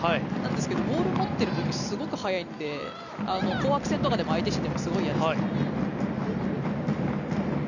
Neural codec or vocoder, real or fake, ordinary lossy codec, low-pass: none; real; none; 7.2 kHz